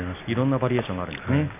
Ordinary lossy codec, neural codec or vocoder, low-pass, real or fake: none; none; 3.6 kHz; real